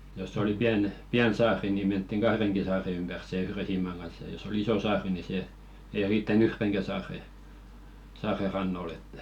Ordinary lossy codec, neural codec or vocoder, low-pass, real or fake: none; none; 19.8 kHz; real